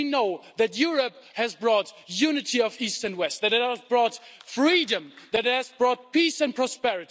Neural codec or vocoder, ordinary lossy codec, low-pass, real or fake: none; none; none; real